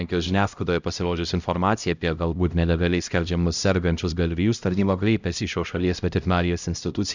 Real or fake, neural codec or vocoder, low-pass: fake; codec, 16 kHz, 0.5 kbps, X-Codec, HuBERT features, trained on LibriSpeech; 7.2 kHz